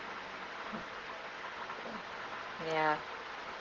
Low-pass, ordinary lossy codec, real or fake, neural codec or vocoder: 7.2 kHz; Opus, 16 kbps; real; none